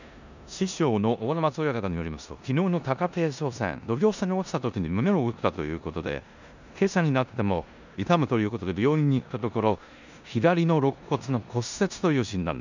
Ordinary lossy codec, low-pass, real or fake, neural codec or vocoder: none; 7.2 kHz; fake; codec, 16 kHz in and 24 kHz out, 0.9 kbps, LongCat-Audio-Codec, four codebook decoder